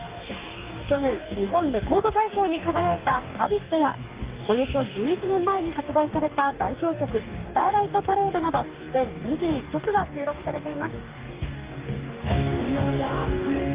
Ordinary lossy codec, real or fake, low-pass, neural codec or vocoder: Opus, 32 kbps; fake; 3.6 kHz; codec, 44.1 kHz, 2.6 kbps, DAC